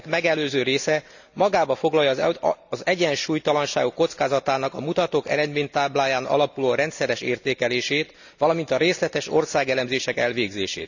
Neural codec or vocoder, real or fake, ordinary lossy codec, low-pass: none; real; none; 7.2 kHz